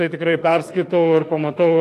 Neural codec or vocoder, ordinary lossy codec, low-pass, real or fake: autoencoder, 48 kHz, 32 numbers a frame, DAC-VAE, trained on Japanese speech; AAC, 64 kbps; 14.4 kHz; fake